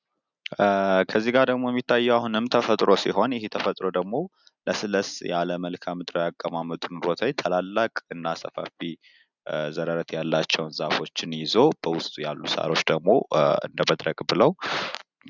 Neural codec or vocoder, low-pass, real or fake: none; 7.2 kHz; real